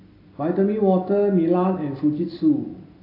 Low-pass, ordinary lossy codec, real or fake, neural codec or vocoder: 5.4 kHz; AAC, 24 kbps; real; none